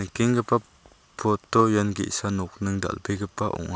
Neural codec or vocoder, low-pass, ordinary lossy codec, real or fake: none; none; none; real